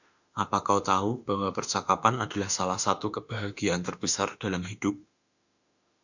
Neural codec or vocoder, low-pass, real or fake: autoencoder, 48 kHz, 32 numbers a frame, DAC-VAE, trained on Japanese speech; 7.2 kHz; fake